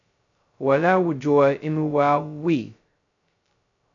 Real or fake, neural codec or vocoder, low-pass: fake; codec, 16 kHz, 0.2 kbps, FocalCodec; 7.2 kHz